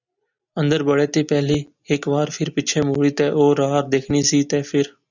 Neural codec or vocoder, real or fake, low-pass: none; real; 7.2 kHz